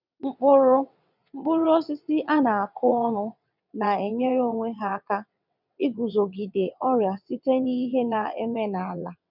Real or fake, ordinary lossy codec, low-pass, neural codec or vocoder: fake; none; 5.4 kHz; vocoder, 22.05 kHz, 80 mel bands, WaveNeXt